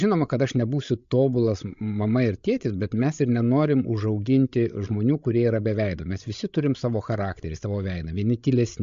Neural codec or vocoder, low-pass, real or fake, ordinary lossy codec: codec, 16 kHz, 16 kbps, FreqCodec, larger model; 7.2 kHz; fake; MP3, 48 kbps